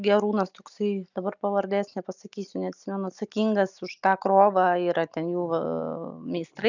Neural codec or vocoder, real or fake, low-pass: none; real; 7.2 kHz